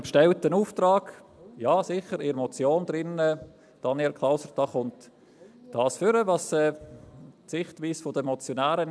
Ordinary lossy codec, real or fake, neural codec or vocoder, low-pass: none; real; none; none